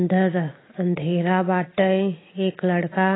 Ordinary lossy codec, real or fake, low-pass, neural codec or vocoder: AAC, 16 kbps; real; 7.2 kHz; none